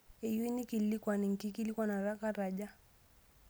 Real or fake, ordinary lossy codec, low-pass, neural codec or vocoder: real; none; none; none